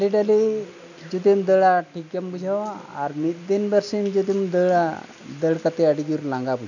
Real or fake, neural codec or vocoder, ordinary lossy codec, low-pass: real; none; none; 7.2 kHz